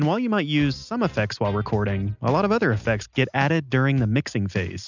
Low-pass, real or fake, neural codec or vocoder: 7.2 kHz; real; none